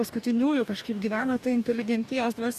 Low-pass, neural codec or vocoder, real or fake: 14.4 kHz; codec, 44.1 kHz, 2.6 kbps, DAC; fake